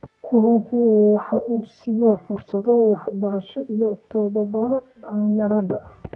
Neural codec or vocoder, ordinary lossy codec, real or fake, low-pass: codec, 24 kHz, 0.9 kbps, WavTokenizer, medium music audio release; none; fake; 10.8 kHz